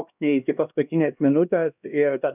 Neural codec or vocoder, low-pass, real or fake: codec, 16 kHz, 1 kbps, X-Codec, HuBERT features, trained on LibriSpeech; 3.6 kHz; fake